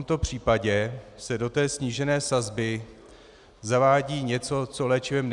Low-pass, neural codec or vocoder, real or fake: 10.8 kHz; none; real